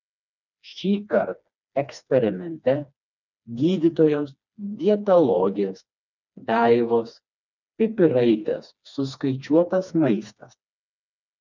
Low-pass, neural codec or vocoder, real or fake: 7.2 kHz; codec, 16 kHz, 2 kbps, FreqCodec, smaller model; fake